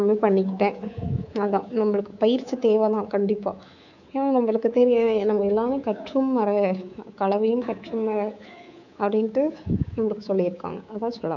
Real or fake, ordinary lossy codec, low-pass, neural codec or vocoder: fake; none; 7.2 kHz; codec, 24 kHz, 3.1 kbps, DualCodec